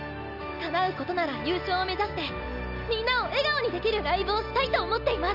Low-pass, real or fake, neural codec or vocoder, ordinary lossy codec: 5.4 kHz; real; none; none